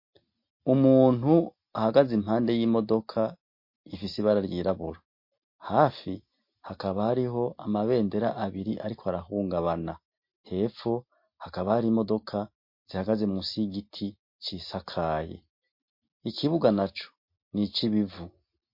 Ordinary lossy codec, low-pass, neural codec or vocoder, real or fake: MP3, 32 kbps; 5.4 kHz; none; real